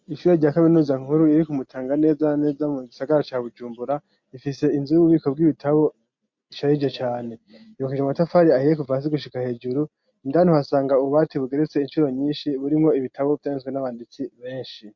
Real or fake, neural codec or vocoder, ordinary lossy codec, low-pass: real; none; MP3, 48 kbps; 7.2 kHz